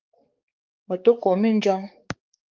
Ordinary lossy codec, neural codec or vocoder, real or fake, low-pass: Opus, 32 kbps; codec, 16 kHz, 4 kbps, X-Codec, HuBERT features, trained on balanced general audio; fake; 7.2 kHz